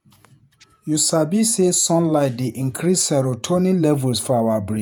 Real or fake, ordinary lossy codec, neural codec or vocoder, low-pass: fake; none; vocoder, 48 kHz, 128 mel bands, Vocos; none